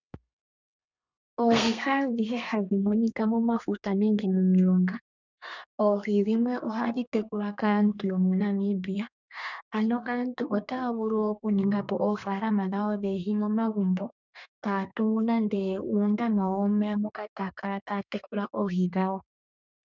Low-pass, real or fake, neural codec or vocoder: 7.2 kHz; fake; codec, 32 kHz, 1.9 kbps, SNAC